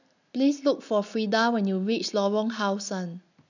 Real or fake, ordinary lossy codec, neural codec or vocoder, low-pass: real; none; none; 7.2 kHz